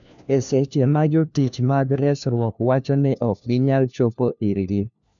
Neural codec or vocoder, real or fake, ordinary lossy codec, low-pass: codec, 16 kHz, 1 kbps, FunCodec, trained on LibriTTS, 50 frames a second; fake; none; 7.2 kHz